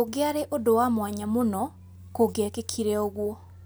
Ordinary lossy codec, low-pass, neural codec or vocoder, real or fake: none; none; none; real